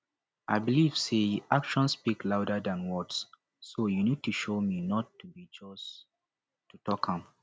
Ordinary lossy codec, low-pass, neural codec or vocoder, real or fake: none; none; none; real